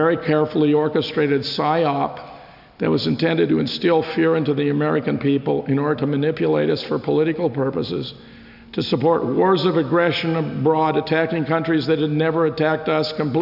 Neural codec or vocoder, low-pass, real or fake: none; 5.4 kHz; real